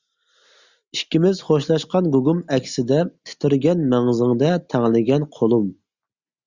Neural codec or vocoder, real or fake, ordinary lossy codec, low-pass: none; real; Opus, 64 kbps; 7.2 kHz